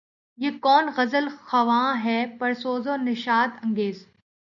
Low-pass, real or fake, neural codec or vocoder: 7.2 kHz; real; none